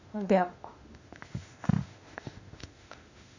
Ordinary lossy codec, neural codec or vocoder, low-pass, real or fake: none; autoencoder, 48 kHz, 32 numbers a frame, DAC-VAE, trained on Japanese speech; 7.2 kHz; fake